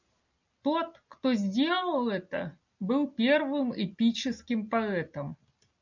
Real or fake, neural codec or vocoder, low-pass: real; none; 7.2 kHz